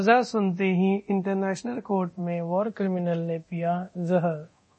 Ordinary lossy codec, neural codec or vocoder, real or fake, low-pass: MP3, 32 kbps; codec, 24 kHz, 0.9 kbps, DualCodec; fake; 10.8 kHz